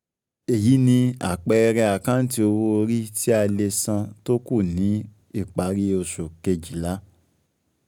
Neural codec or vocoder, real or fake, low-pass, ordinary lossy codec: none; real; 19.8 kHz; none